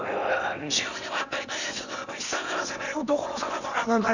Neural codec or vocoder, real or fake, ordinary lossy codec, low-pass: codec, 16 kHz in and 24 kHz out, 0.8 kbps, FocalCodec, streaming, 65536 codes; fake; none; 7.2 kHz